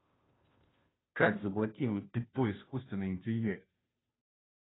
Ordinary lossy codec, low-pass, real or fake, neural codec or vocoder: AAC, 16 kbps; 7.2 kHz; fake; codec, 16 kHz, 0.5 kbps, FunCodec, trained on Chinese and English, 25 frames a second